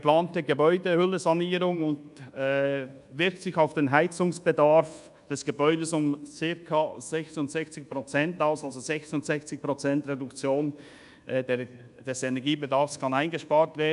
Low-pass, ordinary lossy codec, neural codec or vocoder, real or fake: 10.8 kHz; none; codec, 24 kHz, 1.2 kbps, DualCodec; fake